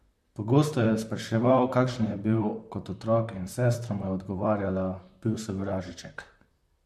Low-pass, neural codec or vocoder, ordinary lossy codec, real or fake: 14.4 kHz; vocoder, 44.1 kHz, 128 mel bands, Pupu-Vocoder; MP3, 64 kbps; fake